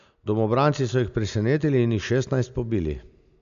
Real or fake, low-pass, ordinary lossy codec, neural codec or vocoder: real; 7.2 kHz; none; none